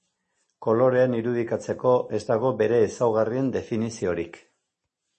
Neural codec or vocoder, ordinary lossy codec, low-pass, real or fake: none; MP3, 32 kbps; 10.8 kHz; real